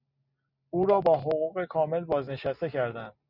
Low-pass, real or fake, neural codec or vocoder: 5.4 kHz; real; none